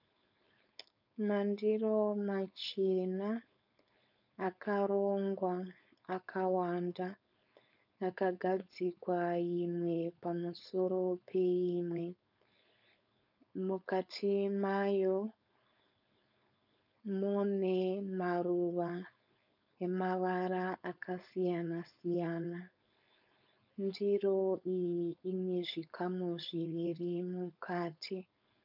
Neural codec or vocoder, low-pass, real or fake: codec, 16 kHz, 4.8 kbps, FACodec; 5.4 kHz; fake